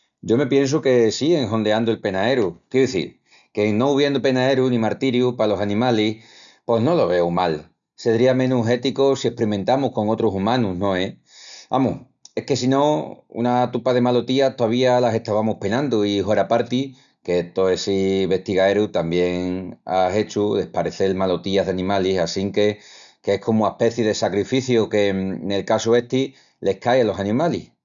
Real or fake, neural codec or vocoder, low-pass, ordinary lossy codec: real; none; 7.2 kHz; none